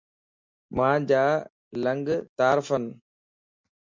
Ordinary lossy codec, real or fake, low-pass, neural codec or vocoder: MP3, 48 kbps; real; 7.2 kHz; none